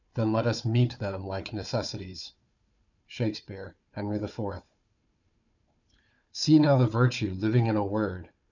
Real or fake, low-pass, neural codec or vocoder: fake; 7.2 kHz; codec, 16 kHz, 4 kbps, FunCodec, trained on Chinese and English, 50 frames a second